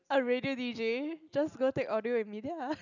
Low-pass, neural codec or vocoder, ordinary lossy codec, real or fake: 7.2 kHz; none; none; real